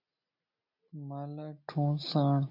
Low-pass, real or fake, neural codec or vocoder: 5.4 kHz; real; none